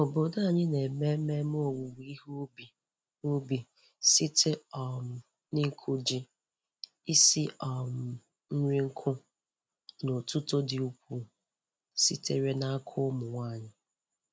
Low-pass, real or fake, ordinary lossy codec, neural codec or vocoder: none; real; none; none